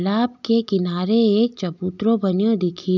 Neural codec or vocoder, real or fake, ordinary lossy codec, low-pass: none; real; none; 7.2 kHz